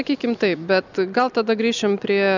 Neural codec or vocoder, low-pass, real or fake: none; 7.2 kHz; real